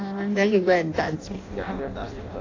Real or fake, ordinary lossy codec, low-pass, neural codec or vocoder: fake; AAC, 32 kbps; 7.2 kHz; codec, 16 kHz in and 24 kHz out, 0.6 kbps, FireRedTTS-2 codec